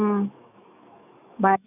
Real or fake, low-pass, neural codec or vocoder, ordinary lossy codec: fake; 3.6 kHz; autoencoder, 48 kHz, 128 numbers a frame, DAC-VAE, trained on Japanese speech; none